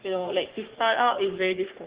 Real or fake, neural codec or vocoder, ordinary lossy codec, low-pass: fake; codec, 44.1 kHz, 3.4 kbps, Pupu-Codec; Opus, 16 kbps; 3.6 kHz